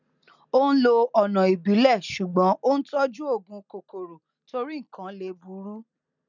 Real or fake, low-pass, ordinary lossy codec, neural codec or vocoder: real; 7.2 kHz; none; none